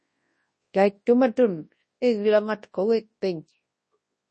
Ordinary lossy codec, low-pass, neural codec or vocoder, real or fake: MP3, 32 kbps; 10.8 kHz; codec, 24 kHz, 0.9 kbps, WavTokenizer, large speech release; fake